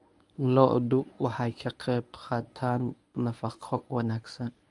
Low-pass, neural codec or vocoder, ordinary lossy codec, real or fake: 10.8 kHz; codec, 24 kHz, 0.9 kbps, WavTokenizer, medium speech release version 1; none; fake